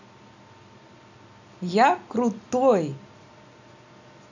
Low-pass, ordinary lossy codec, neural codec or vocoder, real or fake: 7.2 kHz; none; none; real